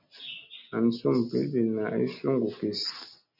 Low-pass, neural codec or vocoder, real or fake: 5.4 kHz; none; real